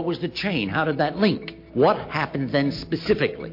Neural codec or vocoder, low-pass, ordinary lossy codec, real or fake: none; 5.4 kHz; MP3, 32 kbps; real